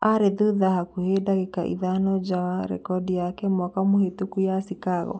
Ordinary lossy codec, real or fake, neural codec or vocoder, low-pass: none; real; none; none